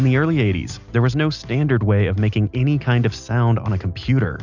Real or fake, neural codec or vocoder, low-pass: real; none; 7.2 kHz